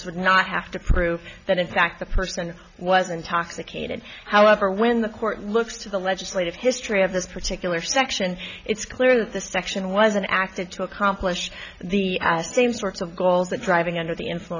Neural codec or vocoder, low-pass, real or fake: none; 7.2 kHz; real